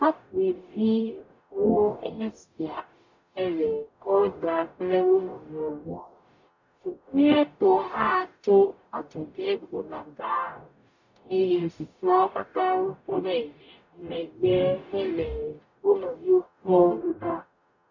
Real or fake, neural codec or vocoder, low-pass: fake; codec, 44.1 kHz, 0.9 kbps, DAC; 7.2 kHz